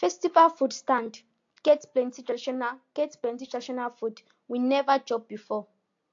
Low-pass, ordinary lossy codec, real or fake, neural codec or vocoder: 7.2 kHz; AAC, 48 kbps; real; none